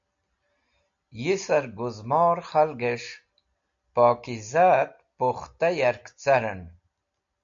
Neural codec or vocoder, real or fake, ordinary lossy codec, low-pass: none; real; AAC, 64 kbps; 7.2 kHz